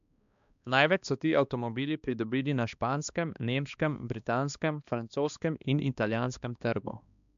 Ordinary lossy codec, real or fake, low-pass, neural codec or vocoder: MP3, 64 kbps; fake; 7.2 kHz; codec, 16 kHz, 2 kbps, X-Codec, HuBERT features, trained on balanced general audio